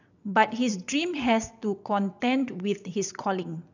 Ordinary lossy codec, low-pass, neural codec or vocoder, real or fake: none; 7.2 kHz; vocoder, 22.05 kHz, 80 mel bands, WaveNeXt; fake